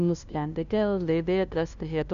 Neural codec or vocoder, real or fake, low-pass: codec, 16 kHz, 0.5 kbps, FunCodec, trained on LibriTTS, 25 frames a second; fake; 7.2 kHz